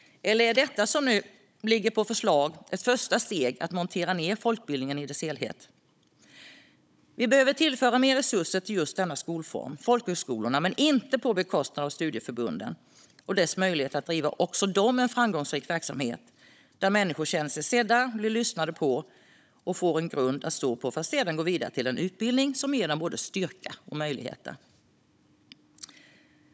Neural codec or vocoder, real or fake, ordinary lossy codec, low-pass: codec, 16 kHz, 16 kbps, FunCodec, trained on Chinese and English, 50 frames a second; fake; none; none